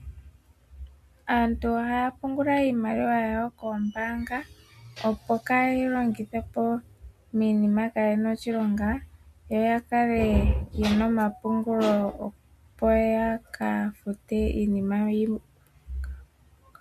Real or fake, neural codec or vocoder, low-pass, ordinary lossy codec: real; none; 14.4 kHz; MP3, 64 kbps